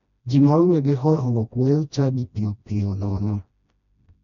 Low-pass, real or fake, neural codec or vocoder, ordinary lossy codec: 7.2 kHz; fake; codec, 16 kHz, 1 kbps, FreqCodec, smaller model; none